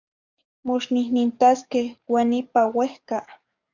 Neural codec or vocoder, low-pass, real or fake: codec, 44.1 kHz, 7.8 kbps, DAC; 7.2 kHz; fake